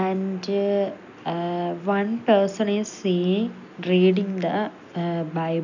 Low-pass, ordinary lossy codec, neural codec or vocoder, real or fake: 7.2 kHz; none; none; real